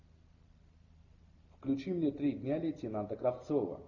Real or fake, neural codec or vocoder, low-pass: real; none; 7.2 kHz